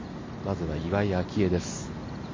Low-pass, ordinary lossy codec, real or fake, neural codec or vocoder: 7.2 kHz; MP3, 32 kbps; real; none